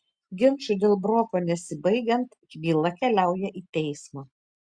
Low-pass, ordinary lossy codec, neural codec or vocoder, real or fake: 9.9 kHz; Opus, 64 kbps; none; real